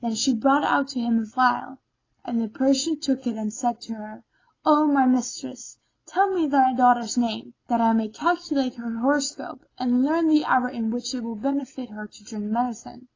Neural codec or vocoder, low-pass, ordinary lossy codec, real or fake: none; 7.2 kHz; AAC, 32 kbps; real